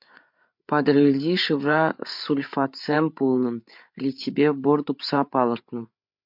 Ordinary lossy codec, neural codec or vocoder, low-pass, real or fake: MP3, 48 kbps; codec, 16 kHz, 8 kbps, FreqCodec, larger model; 5.4 kHz; fake